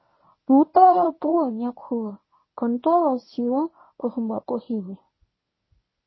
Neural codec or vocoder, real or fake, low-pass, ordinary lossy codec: codec, 24 kHz, 0.9 kbps, WavTokenizer, medium speech release version 1; fake; 7.2 kHz; MP3, 24 kbps